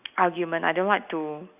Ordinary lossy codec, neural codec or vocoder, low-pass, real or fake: none; none; 3.6 kHz; real